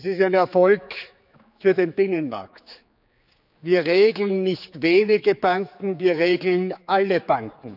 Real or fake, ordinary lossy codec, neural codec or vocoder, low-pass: fake; none; codec, 16 kHz, 4 kbps, X-Codec, HuBERT features, trained on general audio; 5.4 kHz